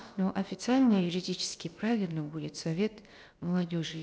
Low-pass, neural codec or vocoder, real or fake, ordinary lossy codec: none; codec, 16 kHz, about 1 kbps, DyCAST, with the encoder's durations; fake; none